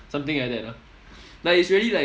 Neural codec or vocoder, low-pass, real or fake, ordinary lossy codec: none; none; real; none